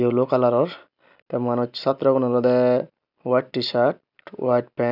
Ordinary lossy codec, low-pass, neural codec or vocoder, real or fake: none; 5.4 kHz; none; real